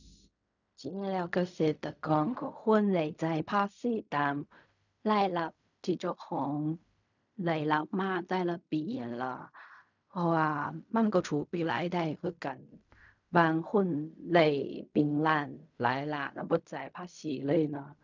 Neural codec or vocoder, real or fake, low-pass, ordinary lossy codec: codec, 16 kHz in and 24 kHz out, 0.4 kbps, LongCat-Audio-Codec, fine tuned four codebook decoder; fake; 7.2 kHz; none